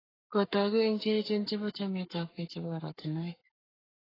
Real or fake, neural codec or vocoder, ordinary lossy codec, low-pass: fake; codec, 44.1 kHz, 7.8 kbps, Pupu-Codec; AAC, 24 kbps; 5.4 kHz